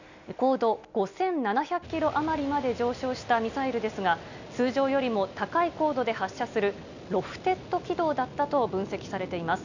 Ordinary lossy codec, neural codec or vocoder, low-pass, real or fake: none; none; 7.2 kHz; real